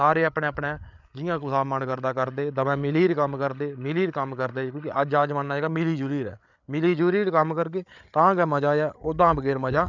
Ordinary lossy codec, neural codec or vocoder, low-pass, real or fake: none; codec, 16 kHz, 8 kbps, FreqCodec, larger model; 7.2 kHz; fake